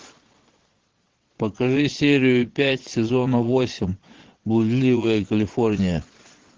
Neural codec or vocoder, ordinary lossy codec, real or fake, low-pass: vocoder, 22.05 kHz, 80 mel bands, Vocos; Opus, 16 kbps; fake; 7.2 kHz